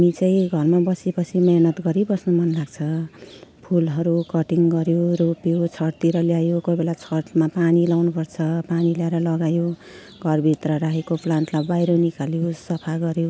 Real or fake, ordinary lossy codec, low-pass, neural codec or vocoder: real; none; none; none